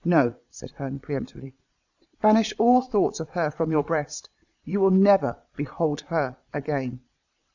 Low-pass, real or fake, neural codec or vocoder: 7.2 kHz; fake; vocoder, 22.05 kHz, 80 mel bands, Vocos